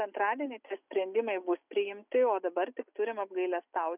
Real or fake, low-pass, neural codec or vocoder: real; 3.6 kHz; none